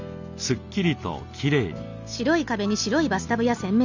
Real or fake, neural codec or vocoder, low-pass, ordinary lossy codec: real; none; 7.2 kHz; none